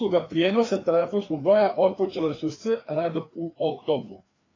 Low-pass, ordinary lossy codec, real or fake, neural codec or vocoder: 7.2 kHz; AAC, 32 kbps; fake; codec, 16 kHz, 2 kbps, FreqCodec, larger model